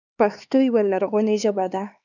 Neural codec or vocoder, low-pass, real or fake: codec, 16 kHz, 2 kbps, X-Codec, HuBERT features, trained on LibriSpeech; 7.2 kHz; fake